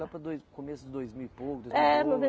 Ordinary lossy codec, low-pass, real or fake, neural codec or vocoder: none; none; real; none